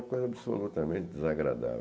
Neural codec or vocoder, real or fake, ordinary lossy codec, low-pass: none; real; none; none